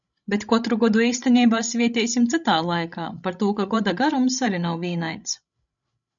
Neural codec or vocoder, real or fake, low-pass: codec, 16 kHz, 16 kbps, FreqCodec, larger model; fake; 7.2 kHz